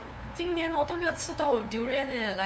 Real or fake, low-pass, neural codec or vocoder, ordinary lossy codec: fake; none; codec, 16 kHz, 2 kbps, FunCodec, trained on LibriTTS, 25 frames a second; none